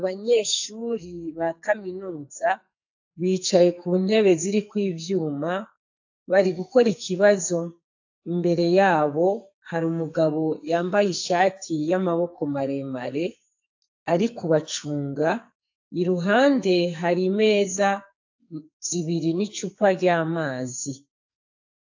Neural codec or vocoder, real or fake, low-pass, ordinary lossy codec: codec, 44.1 kHz, 2.6 kbps, SNAC; fake; 7.2 kHz; AAC, 48 kbps